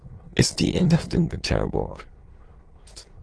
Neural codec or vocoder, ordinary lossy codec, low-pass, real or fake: autoencoder, 22.05 kHz, a latent of 192 numbers a frame, VITS, trained on many speakers; Opus, 16 kbps; 9.9 kHz; fake